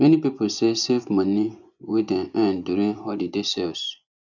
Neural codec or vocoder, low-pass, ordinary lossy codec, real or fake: vocoder, 24 kHz, 100 mel bands, Vocos; 7.2 kHz; none; fake